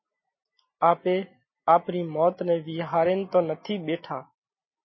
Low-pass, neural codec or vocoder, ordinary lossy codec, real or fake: 7.2 kHz; none; MP3, 24 kbps; real